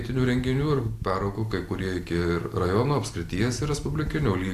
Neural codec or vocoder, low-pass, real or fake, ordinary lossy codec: none; 14.4 kHz; real; AAC, 64 kbps